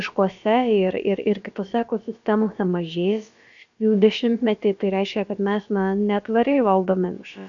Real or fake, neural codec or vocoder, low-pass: fake; codec, 16 kHz, about 1 kbps, DyCAST, with the encoder's durations; 7.2 kHz